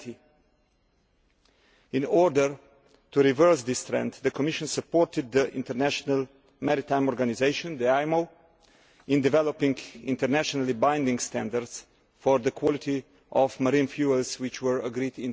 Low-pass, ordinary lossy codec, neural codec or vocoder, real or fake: none; none; none; real